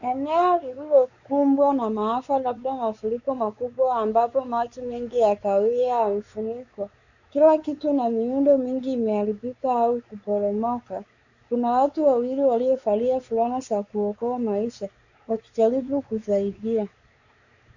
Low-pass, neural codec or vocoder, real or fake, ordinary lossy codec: 7.2 kHz; codec, 16 kHz, 4 kbps, X-Codec, WavLM features, trained on Multilingual LibriSpeech; fake; Opus, 64 kbps